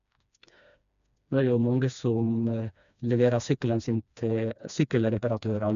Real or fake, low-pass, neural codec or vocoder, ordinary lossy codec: fake; 7.2 kHz; codec, 16 kHz, 2 kbps, FreqCodec, smaller model; none